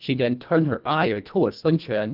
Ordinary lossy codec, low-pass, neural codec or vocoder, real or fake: Opus, 24 kbps; 5.4 kHz; codec, 24 kHz, 1.5 kbps, HILCodec; fake